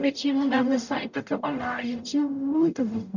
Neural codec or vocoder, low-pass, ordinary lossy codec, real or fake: codec, 44.1 kHz, 0.9 kbps, DAC; 7.2 kHz; none; fake